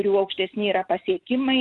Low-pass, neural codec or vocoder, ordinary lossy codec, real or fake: 10.8 kHz; none; Opus, 24 kbps; real